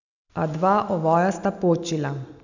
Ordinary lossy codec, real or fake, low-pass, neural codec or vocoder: none; real; 7.2 kHz; none